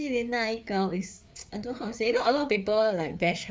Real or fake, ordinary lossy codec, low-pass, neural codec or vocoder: fake; none; none; codec, 16 kHz, 4 kbps, FreqCodec, larger model